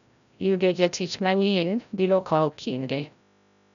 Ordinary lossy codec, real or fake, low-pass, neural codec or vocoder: none; fake; 7.2 kHz; codec, 16 kHz, 0.5 kbps, FreqCodec, larger model